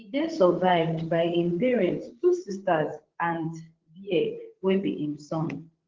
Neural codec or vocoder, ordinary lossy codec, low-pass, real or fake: codec, 16 kHz, 8 kbps, FreqCodec, larger model; Opus, 16 kbps; 7.2 kHz; fake